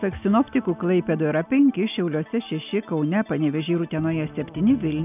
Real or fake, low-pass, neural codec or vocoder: real; 3.6 kHz; none